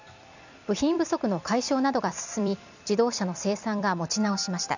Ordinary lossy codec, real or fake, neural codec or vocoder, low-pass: none; fake; vocoder, 44.1 kHz, 128 mel bands every 256 samples, BigVGAN v2; 7.2 kHz